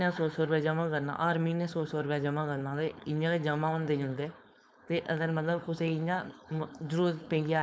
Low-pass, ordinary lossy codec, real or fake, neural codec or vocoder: none; none; fake; codec, 16 kHz, 4.8 kbps, FACodec